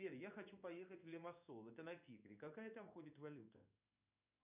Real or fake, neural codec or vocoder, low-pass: fake; codec, 16 kHz in and 24 kHz out, 1 kbps, XY-Tokenizer; 3.6 kHz